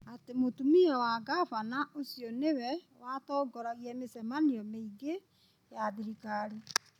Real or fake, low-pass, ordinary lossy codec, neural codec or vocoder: real; 19.8 kHz; none; none